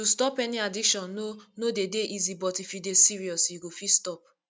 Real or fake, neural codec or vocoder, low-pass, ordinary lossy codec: real; none; none; none